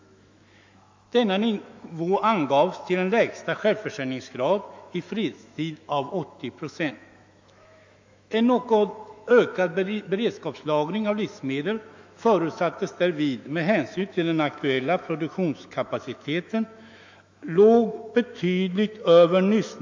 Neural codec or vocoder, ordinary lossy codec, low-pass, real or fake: autoencoder, 48 kHz, 128 numbers a frame, DAC-VAE, trained on Japanese speech; MP3, 48 kbps; 7.2 kHz; fake